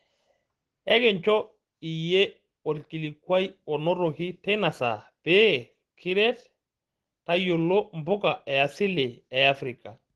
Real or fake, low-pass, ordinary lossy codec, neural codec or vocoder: real; 10.8 kHz; Opus, 16 kbps; none